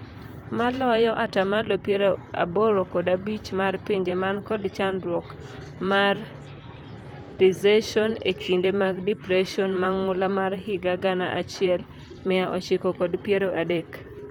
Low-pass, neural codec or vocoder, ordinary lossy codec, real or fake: 19.8 kHz; vocoder, 44.1 kHz, 128 mel bands, Pupu-Vocoder; none; fake